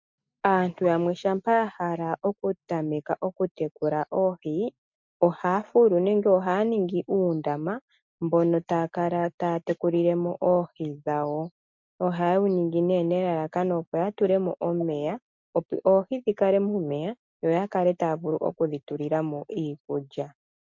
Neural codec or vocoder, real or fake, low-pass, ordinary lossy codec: none; real; 7.2 kHz; MP3, 48 kbps